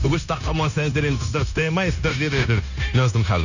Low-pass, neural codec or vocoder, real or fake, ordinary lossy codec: 7.2 kHz; codec, 16 kHz, 0.9 kbps, LongCat-Audio-Codec; fake; none